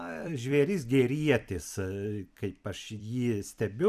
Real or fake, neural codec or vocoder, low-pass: fake; vocoder, 44.1 kHz, 128 mel bands every 256 samples, BigVGAN v2; 14.4 kHz